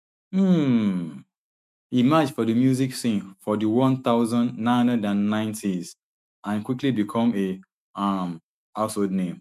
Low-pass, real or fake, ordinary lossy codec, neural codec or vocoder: 14.4 kHz; fake; MP3, 96 kbps; autoencoder, 48 kHz, 128 numbers a frame, DAC-VAE, trained on Japanese speech